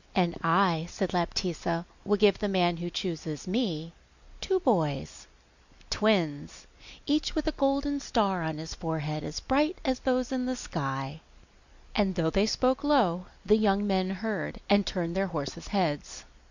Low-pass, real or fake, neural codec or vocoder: 7.2 kHz; real; none